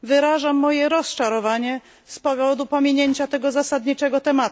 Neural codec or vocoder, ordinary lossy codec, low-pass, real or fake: none; none; none; real